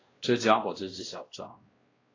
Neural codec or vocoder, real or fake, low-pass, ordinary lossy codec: codec, 16 kHz, 1 kbps, X-Codec, WavLM features, trained on Multilingual LibriSpeech; fake; 7.2 kHz; AAC, 32 kbps